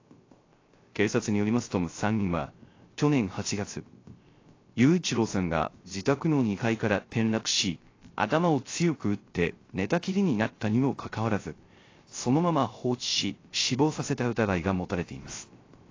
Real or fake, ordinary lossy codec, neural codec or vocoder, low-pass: fake; AAC, 32 kbps; codec, 16 kHz, 0.3 kbps, FocalCodec; 7.2 kHz